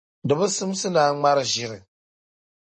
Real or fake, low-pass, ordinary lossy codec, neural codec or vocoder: real; 9.9 kHz; MP3, 32 kbps; none